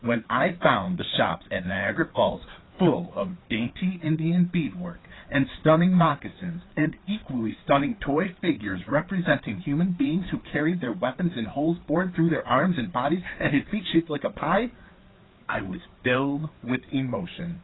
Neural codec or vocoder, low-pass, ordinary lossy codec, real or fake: codec, 16 kHz, 4 kbps, FreqCodec, larger model; 7.2 kHz; AAC, 16 kbps; fake